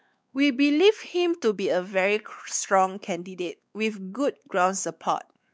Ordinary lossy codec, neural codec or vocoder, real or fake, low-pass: none; codec, 16 kHz, 4 kbps, X-Codec, WavLM features, trained on Multilingual LibriSpeech; fake; none